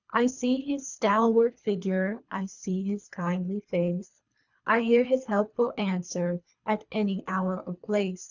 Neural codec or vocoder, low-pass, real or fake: codec, 24 kHz, 3 kbps, HILCodec; 7.2 kHz; fake